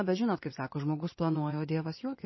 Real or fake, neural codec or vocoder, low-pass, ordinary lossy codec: fake; vocoder, 24 kHz, 100 mel bands, Vocos; 7.2 kHz; MP3, 24 kbps